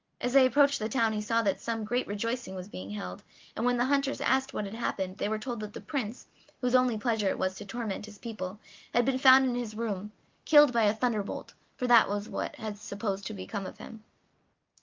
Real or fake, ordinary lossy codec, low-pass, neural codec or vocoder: real; Opus, 24 kbps; 7.2 kHz; none